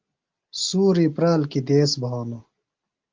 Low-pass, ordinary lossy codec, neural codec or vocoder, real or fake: 7.2 kHz; Opus, 32 kbps; none; real